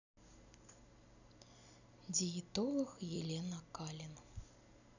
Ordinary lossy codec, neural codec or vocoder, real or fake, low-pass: none; none; real; 7.2 kHz